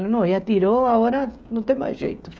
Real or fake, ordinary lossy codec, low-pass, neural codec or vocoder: fake; none; none; codec, 16 kHz, 16 kbps, FreqCodec, smaller model